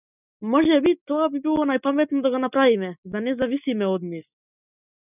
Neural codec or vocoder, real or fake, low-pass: none; real; 3.6 kHz